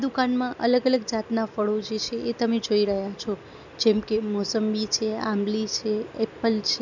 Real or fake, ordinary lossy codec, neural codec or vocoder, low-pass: real; none; none; 7.2 kHz